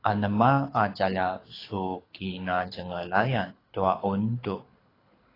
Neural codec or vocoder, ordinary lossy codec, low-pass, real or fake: codec, 24 kHz, 6 kbps, HILCodec; AAC, 24 kbps; 5.4 kHz; fake